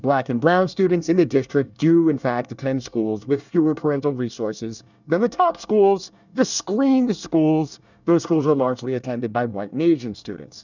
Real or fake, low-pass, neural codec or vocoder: fake; 7.2 kHz; codec, 24 kHz, 1 kbps, SNAC